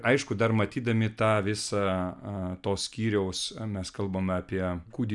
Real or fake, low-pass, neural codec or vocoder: real; 10.8 kHz; none